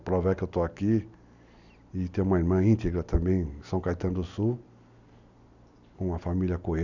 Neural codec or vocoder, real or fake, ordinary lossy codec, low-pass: none; real; none; 7.2 kHz